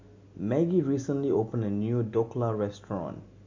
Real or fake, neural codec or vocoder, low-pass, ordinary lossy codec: real; none; 7.2 kHz; MP3, 48 kbps